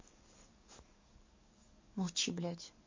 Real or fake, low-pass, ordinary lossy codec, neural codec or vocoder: fake; 7.2 kHz; MP3, 32 kbps; codec, 44.1 kHz, 7.8 kbps, DAC